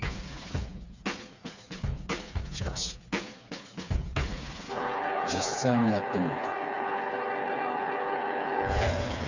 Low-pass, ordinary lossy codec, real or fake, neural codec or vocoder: 7.2 kHz; none; fake; codec, 16 kHz, 4 kbps, FreqCodec, smaller model